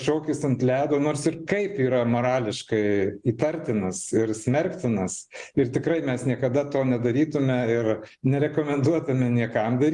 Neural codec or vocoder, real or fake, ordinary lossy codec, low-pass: none; real; Opus, 24 kbps; 10.8 kHz